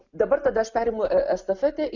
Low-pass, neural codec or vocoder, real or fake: 7.2 kHz; none; real